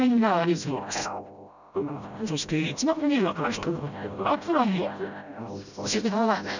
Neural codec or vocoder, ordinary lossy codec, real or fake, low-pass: codec, 16 kHz, 0.5 kbps, FreqCodec, smaller model; none; fake; 7.2 kHz